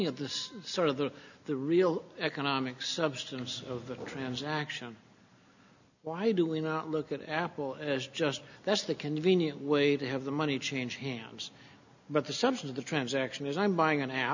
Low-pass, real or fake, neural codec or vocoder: 7.2 kHz; real; none